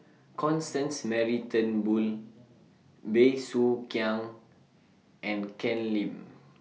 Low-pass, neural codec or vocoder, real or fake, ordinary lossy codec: none; none; real; none